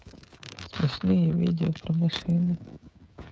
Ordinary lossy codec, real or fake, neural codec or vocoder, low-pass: none; fake; codec, 16 kHz, 16 kbps, FreqCodec, smaller model; none